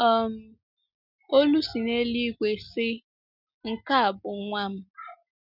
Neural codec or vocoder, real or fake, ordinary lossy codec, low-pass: none; real; none; 5.4 kHz